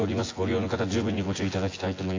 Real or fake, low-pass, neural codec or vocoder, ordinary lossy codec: fake; 7.2 kHz; vocoder, 24 kHz, 100 mel bands, Vocos; AAC, 32 kbps